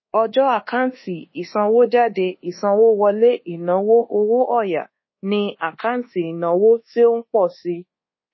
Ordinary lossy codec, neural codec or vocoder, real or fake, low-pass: MP3, 24 kbps; codec, 24 kHz, 1.2 kbps, DualCodec; fake; 7.2 kHz